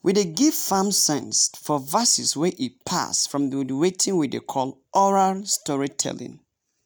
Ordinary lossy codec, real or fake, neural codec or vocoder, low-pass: none; real; none; none